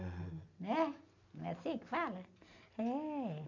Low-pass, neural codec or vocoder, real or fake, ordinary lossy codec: 7.2 kHz; none; real; none